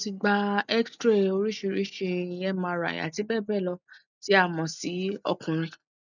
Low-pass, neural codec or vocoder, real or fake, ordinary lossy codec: 7.2 kHz; none; real; none